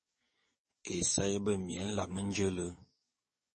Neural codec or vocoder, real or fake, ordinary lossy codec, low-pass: codec, 44.1 kHz, 7.8 kbps, DAC; fake; MP3, 32 kbps; 10.8 kHz